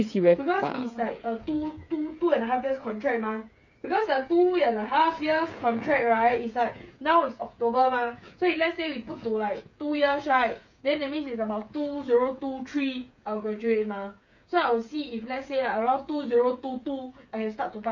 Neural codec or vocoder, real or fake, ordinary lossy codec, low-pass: codec, 16 kHz, 8 kbps, FreqCodec, smaller model; fake; none; 7.2 kHz